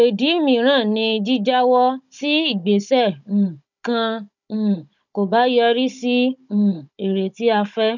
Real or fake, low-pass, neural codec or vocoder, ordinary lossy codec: fake; 7.2 kHz; codec, 16 kHz, 16 kbps, FunCodec, trained on Chinese and English, 50 frames a second; none